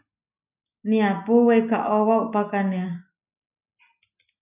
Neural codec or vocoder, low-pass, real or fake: none; 3.6 kHz; real